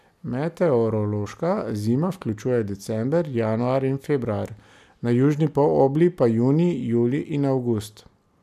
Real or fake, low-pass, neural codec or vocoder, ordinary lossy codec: real; 14.4 kHz; none; none